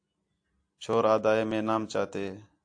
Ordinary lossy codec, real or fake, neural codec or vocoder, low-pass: AAC, 64 kbps; real; none; 9.9 kHz